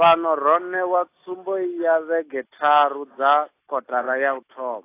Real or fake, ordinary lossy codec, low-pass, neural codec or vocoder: real; AAC, 24 kbps; 3.6 kHz; none